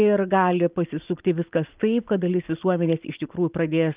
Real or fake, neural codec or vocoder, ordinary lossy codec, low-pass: real; none; Opus, 24 kbps; 3.6 kHz